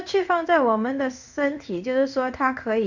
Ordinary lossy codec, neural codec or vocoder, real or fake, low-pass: none; codec, 16 kHz in and 24 kHz out, 1 kbps, XY-Tokenizer; fake; 7.2 kHz